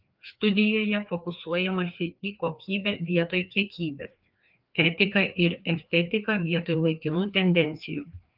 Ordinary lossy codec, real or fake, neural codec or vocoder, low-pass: Opus, 24 kbps; fake; codec, 16 kHz, 2 kbps, FreqCodec, larger model; 5.4 kHz